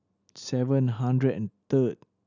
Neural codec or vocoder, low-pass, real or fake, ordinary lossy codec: none; 7.2 kHz; real; none